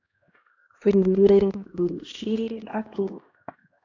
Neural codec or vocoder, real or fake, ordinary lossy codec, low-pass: codec, 16 kHz, 1 kbps, X-Codec, HuBERT features, trained on LibriSpeech; fake; AAC, 48 kbps; 7.2 kHz